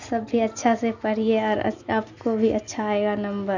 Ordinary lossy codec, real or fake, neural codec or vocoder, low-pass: none; real; none; 7.2 kHz